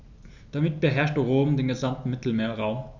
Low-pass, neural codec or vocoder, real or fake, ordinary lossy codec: 7.2 kHz; none; real; none